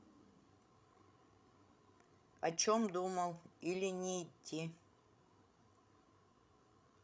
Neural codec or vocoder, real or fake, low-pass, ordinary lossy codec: codec, 16 kHz, 16 kbps, FreqCodec, larger model; fake; none; none